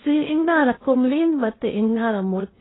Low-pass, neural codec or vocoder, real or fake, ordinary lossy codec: 7.2 kHz; codec, 16 kHz in and 24 kHz out, 0.6 kbps, FocalCodec, streaming, 2048 codes; fake; AAC, 16 kbps